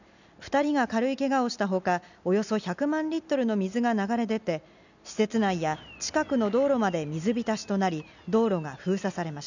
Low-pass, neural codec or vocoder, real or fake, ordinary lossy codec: 7.2 kHz; none; real; none